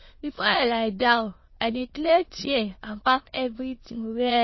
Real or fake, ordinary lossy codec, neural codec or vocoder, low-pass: fake; MP3, 24 kbps; autoencoder, 22.05 kHz, a latent of 192 numbers a frame, VITS, trained on many speakers; 7.2 kHz